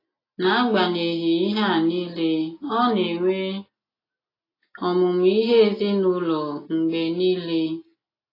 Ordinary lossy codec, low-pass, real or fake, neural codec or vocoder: AAC, 24 kbps; 5.4 kHz; real; none